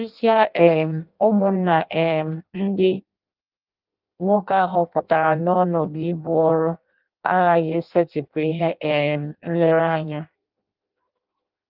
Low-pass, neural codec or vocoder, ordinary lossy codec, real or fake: 5.4 kHz; codec, 16 kHz in and 24 kHz out, 0.6 kbps, FireRedTTS-2 codec; Opus, 24 kbps; fake